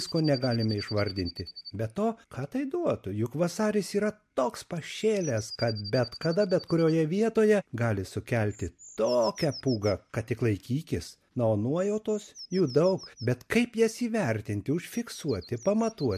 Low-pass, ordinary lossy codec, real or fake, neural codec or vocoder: 14.4 kHz; MP3, 64 kbps; real; none